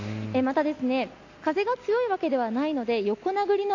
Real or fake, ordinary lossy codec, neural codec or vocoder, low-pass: real; AAC, 48 kbps; none; 7.2 kHz